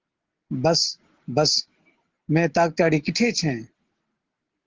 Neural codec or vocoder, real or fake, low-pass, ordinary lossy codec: none; real; 7.2 kHz; Opus, 16 kbps